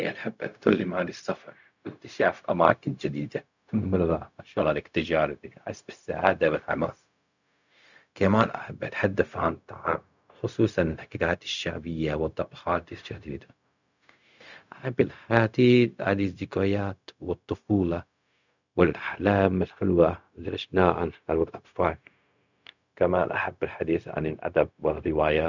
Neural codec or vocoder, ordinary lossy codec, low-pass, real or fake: codec, 16 kHz, 0.4 kbps, LongCat-Audio-Codec; none; 7.2 kHz; fake